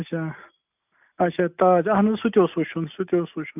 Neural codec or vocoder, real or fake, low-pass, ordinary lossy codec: none; real; 3.6 kHz; none